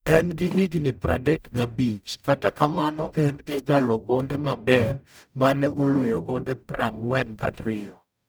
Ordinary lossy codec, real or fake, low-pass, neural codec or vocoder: none; fake; none; codec, 44.1 kHz, 0.9 kbps, DAC